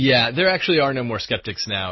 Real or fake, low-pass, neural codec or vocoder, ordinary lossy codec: real; 7.2 kHz; none; MP3, 24 kbps